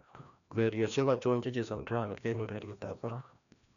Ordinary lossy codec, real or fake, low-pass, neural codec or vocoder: none; fake; 7.2 kHz; codec, 16 kHz, 1 kbps, FreqCodec, larger model